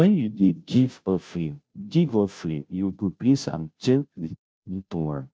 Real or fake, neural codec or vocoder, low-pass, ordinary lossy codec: fake; codec, 16 kHz, 0.5 kbps, FunCodec, trained on Chinese and English, 25 frames a second; none; none